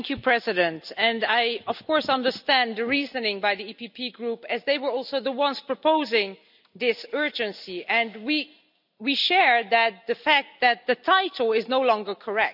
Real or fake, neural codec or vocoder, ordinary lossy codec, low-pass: real; none; none; 5.4 kHz